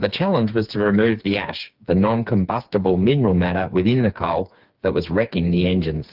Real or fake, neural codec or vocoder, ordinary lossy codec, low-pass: fake; codec, 16 kHz, 4 kbps, FreqCodec, smaller model; Opus, 32 kbps; 5.4 kHz